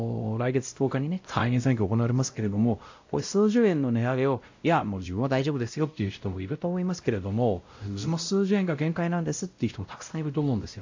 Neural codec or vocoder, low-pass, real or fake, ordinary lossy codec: codec, 16 kHz, 0.5 kbps, X-Codec, WavLM features, trained on Multilingual LibriSpeech; 7.2 kHz; fake; AAC, 48 kbps